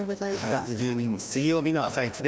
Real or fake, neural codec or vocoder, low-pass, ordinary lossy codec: fake; codec, 16 kHz, 1 kbps, FreqCodec, larger model; none; none